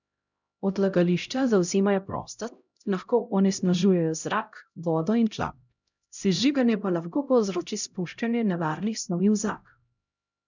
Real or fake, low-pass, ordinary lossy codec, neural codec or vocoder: fake; 7.2 kHz; none; codec, 16 kHz, 0.5 kbps, X-Codec, HuBERT features, trained on LibriSpeech